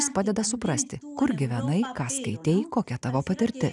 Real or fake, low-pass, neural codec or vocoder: real; 10.8 kHz; none